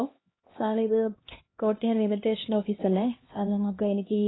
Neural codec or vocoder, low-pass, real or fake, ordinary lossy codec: codec, 16 kHz, 1 kbps, X-Codec, HuBERT features, trained on LibriSpeech; 7.2 kHz; fake; AAC, 16 kbps